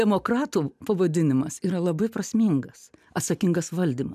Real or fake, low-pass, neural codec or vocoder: real; 14.4 kHz; none